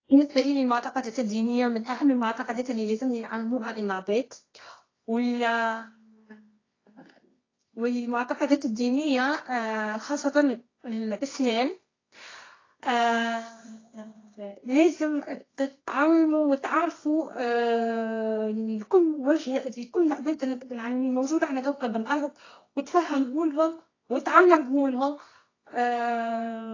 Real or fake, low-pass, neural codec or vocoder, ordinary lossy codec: fake; 7.2 kHz; codec, 24 kHz, 0.9 kbps, WavTokenizer, medium music audio release; AAC, 32 kbps